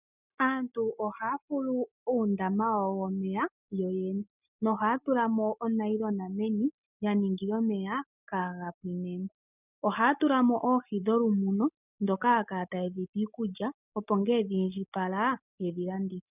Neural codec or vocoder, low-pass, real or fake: none; 3.6 kHz; real